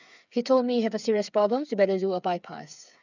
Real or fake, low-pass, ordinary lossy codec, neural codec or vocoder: fake; 7.2 kHz; none; codec, 16 kHz, 8 kbps, FreqCodec, smaller model